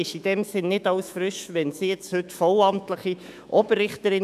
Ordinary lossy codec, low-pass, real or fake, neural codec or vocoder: none; 14.4 kHz; fake; autoencoder, 48 kHz, 128 numbers a frame, DAC-VAE, trained on Japanese speech